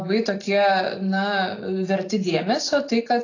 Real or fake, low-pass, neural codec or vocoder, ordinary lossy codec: real; 7.2 kHz; none; AAC, 32 kbps